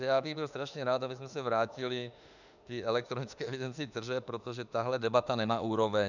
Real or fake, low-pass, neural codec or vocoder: fake; 7.2 kHz; autoencoder, 48 kHz, 32 numbers a frame, DAC-VAE, trained on Japanese speech